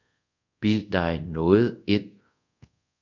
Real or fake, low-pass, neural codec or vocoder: fake; 7.2 kHz; codec, 24 kHz, 0.5 kbps, DualCodec